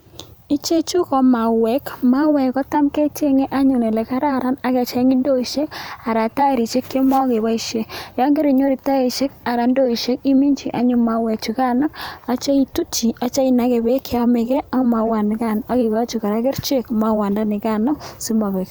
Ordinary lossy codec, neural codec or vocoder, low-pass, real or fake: none; vocoder, 44.1 kHz, 128 mel bands every 512 samples, BigVGAN v2; none; fake